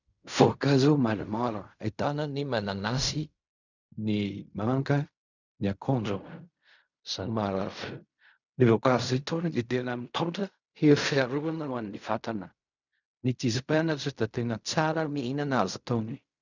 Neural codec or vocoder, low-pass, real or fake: codec, 16 kHz in and 24 kHz out, 0.4 kbps, LongCat-Audio-Codec, fine tuned four codebook decoder; 7.2 kHz; fake